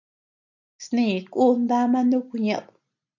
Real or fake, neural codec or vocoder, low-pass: real; none; 7.2 kHz